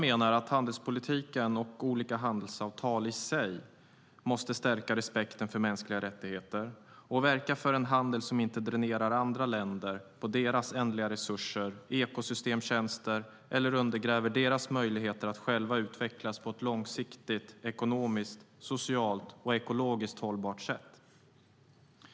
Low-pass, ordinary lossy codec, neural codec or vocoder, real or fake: none; none; none; real